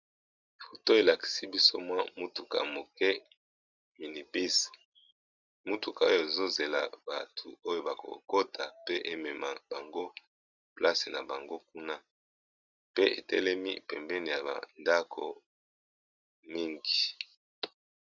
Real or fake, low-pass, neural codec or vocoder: real; 7.2 kHz; none